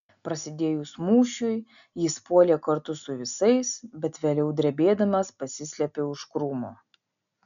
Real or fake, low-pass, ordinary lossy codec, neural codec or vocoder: real; 7.2 kHz; MP3, 96 kbps; none